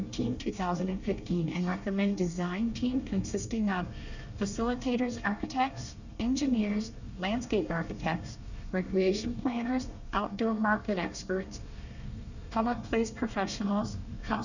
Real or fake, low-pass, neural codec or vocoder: fake; 7.2 kHz; codec, 24 kHz, 1 kbps, SNAC